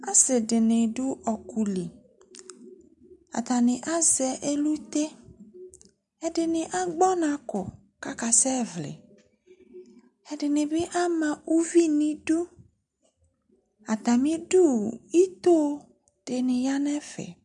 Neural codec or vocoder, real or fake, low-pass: none; real; 10.8 kHz